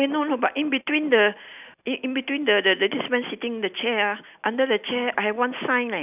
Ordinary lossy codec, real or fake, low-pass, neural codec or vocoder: none; real; 3.6 kHz; none